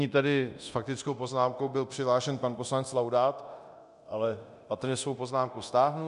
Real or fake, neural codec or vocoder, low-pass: fake; codec, 24 kHz, 0.9 kbps, DualCodec; 10.8 kHz